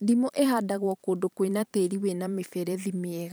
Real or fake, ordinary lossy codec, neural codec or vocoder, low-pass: fake; none; vocoder, 44.1 kHz, 128 mel bands every 512 samples, BigVGAN v2; none